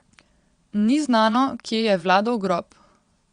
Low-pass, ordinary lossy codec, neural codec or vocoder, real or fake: 9.9 kHz; Opus, 64 kbps; vocoder, 22.05 kHz, 80 mel bands, Vocos; fake